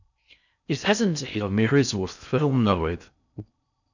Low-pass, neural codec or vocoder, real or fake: 7.2 kHz; codec, 16 kHz in and 24 kHz out, 0.6 kbps, FocalCodec, streaming, 4096 codes; fake